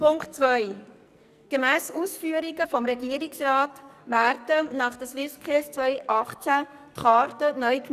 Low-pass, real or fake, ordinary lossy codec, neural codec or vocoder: 14.4 kHz; fake; none; codec, 44.1 kHz, 2.6 kbps, SNAC